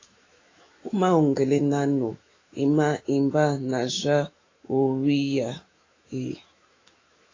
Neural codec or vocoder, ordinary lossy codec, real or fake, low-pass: autoencoder, 48 kHz, 128 numbers a frame, DAC-VAE, trained on Japanese speech; AAC, 32 kbps; fake; 7.2 kHz